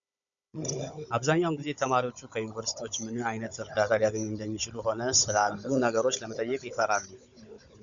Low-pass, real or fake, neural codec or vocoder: 7.2 kHz; fake; codec, 16 kHz, 16 kbps, FunCodec, trained on Chinese and English, 50 frames a second